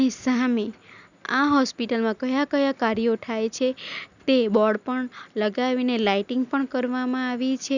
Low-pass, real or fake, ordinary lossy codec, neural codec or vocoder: 7.2 kHz; real; none; none